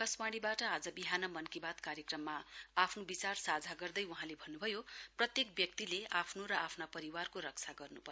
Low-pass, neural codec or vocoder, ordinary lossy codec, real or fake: none; none; none; real